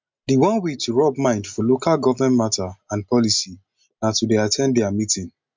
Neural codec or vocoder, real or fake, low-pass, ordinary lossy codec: none; real; 7.2 kHz; MP3, 64 kbps